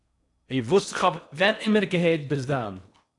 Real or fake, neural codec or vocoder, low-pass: fake; codec, 16 kHz in and 24 kHz out, 0.8 kbps, FocalCodec, streaming, 65536 codes; 10.8 kHz